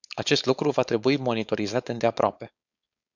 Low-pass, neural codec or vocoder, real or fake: 7.2 kHz; codec, 16 kHz, 4.8 kbps, FACodec; fake